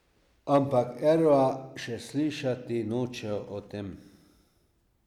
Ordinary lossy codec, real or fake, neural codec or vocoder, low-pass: none; real; none; 19.8 kHz